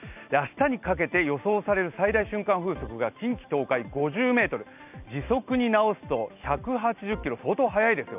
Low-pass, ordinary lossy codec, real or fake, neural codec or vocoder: 3.6 kHz; none; real; none